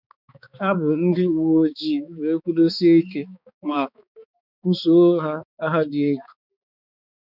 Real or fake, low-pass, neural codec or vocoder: fake; 5.4 kHz; codec, 16 kHz, 4 kbps, X-Codec, HuBERT features, trained on balanced general audio